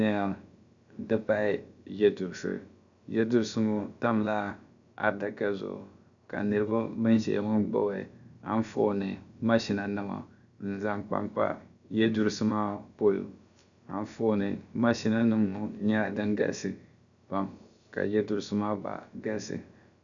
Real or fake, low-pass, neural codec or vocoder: fake; 7.2 kHz; codec, 16 kHz, about 1 kbps, DyCAST, with the encoder's durations